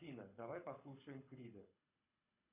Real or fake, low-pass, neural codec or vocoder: fake; 3.6 kHz; codec, 24 kHz, 6 kbps, HILCodec